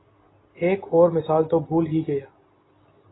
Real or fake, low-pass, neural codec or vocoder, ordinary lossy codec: real; 7.2 kHz; none; AAC, 16 kbps